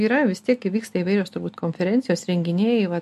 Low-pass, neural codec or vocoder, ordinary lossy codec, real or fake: 14.4 kHz; none; MP3, 64 kbps; real